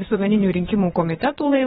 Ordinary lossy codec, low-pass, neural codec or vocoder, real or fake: AAC, 16 kbps; 9.9 kHz; vocoder, 22.05 kHz, 80 mel bands, WaveNeXt; fake